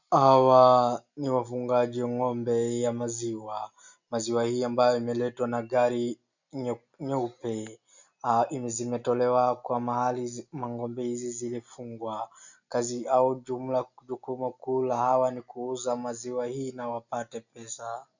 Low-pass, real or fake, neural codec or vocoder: 7.2 kHz; real; none